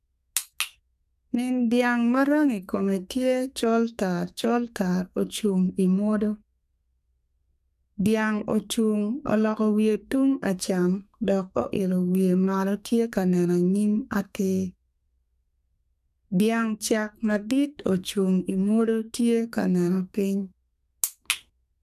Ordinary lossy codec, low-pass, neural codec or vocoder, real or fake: none; 14.4 kHz; codec, 32 kHz, 1.9 kbps, SNAC; fake